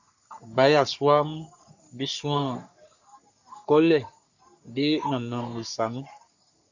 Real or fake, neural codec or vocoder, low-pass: fake; codec, 44.1 kHz, 3.4 kbps, Pupu-Codec; 7.2 kHz